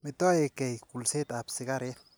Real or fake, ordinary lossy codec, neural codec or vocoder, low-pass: real; none; none; none